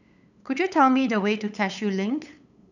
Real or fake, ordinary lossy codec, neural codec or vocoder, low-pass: fake; none; codec, 16 kHz, 8 kbps, FunCodec, trained on LibriTTS, 25 frames a second; 7.2 kHz